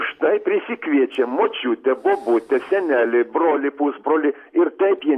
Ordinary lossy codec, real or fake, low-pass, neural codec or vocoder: AAC, 64 kbps; real; 14.4 kHz; none